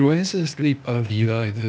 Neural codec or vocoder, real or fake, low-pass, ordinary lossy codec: codec, 16 kHz, 0.8 kbps, ZipCodec; fake; none; none